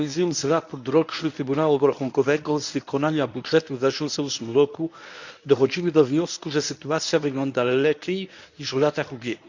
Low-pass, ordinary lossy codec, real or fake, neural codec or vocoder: 7.2 kHz; none; fake; codec, 24 kHz, 0.9 kbps, WavTokenizer, medium speech release version 1